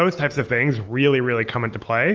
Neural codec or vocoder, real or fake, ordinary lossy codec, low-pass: none; real; Opus, 24 kbps; 7.2 kHz